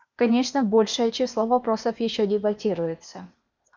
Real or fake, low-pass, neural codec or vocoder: fake; 7.2 kHz; codec, 16 kHz, 0.8 kbps, ZipCodec